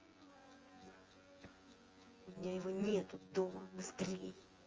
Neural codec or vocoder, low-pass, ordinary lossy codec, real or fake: vocoder, 24 kHz, 100 mel bands, Vocos; 7.2 kHz; Opus, 24 kbps; fake